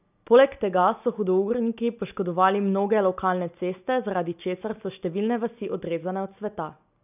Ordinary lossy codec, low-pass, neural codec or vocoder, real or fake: none; 3.6 kHz; none; real